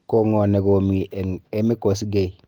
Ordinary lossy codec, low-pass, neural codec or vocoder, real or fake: Opus, 24 kbps; 19.8 kHz; none; real